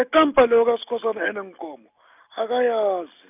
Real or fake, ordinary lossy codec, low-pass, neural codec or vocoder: real; none; 3.6 kHz; none